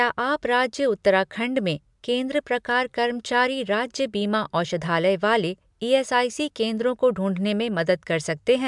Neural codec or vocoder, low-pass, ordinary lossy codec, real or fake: none; 10.8 kHz; MP3, 96 kbps; real